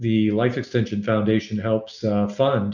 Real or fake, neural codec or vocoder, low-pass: real; none; 7.2 kHz